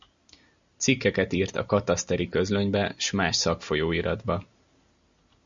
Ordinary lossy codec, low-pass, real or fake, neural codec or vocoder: Opus, 64 kbps; 7.2 kHz; real; none